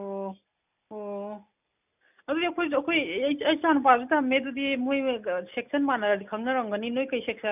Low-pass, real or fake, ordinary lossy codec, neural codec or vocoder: 3.6 kHz; real; none; none